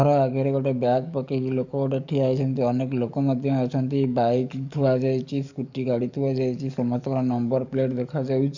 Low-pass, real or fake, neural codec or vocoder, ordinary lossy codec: 7.2 kHz; fake; codec, 44.1 kHz, 7.8 kbps, DAC; none